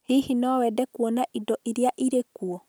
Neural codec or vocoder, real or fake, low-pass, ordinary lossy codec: none; real; none; none